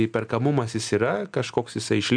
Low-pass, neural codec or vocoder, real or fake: 9.9 kHz; none; real